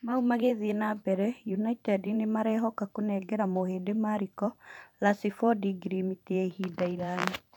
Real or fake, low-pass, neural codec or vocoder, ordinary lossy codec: fake; 19.8 kHz; vocoder, 44.1 kHz, 128 mel bands every 512 samples, BigVGAN v2; none